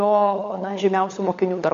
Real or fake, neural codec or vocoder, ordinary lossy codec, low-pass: fake; codec, 16 kHz, 16 kbps, FunCodec, trained on LibriTTS, 50 frames a second; AAC, 48 kbps; 7.2 kHz